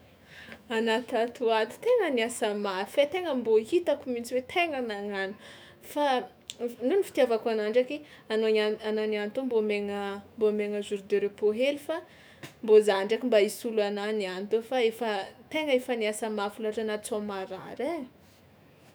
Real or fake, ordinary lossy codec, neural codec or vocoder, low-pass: fake; none; autoencoder, 48 kHz, 128 numbers a frame, DAC-VAE, trained on Japanese speech; none